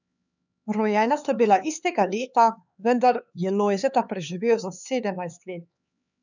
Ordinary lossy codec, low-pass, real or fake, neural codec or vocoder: none; 7.2 kHz; fake; codec, 16 kHz, 4 kbps, X-Codec, HuBERT features, trained on LibriSpeech